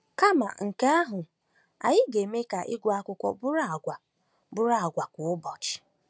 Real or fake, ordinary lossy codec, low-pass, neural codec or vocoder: real; none; none; none